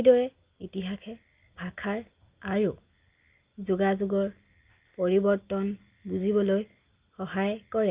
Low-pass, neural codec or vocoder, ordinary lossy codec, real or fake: 3.6 kHz; none; Opus, 24 kbps; real